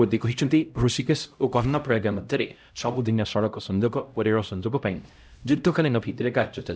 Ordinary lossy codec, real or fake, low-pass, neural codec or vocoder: none; fake; none; codec, 16 kHz, 0.5 kbps, X-Codec, HuBERT features, trained on LibriSpeech